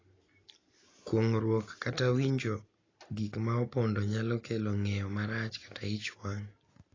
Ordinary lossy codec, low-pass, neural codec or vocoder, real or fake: AAC, 32 kbps; 7.2 kHz; none; real